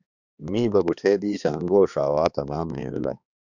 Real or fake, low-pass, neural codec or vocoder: fake; 7.2 kHz; codec, 16 kHz, 2 kbps, X-Codec, HuBERT features, trained on balanced general audio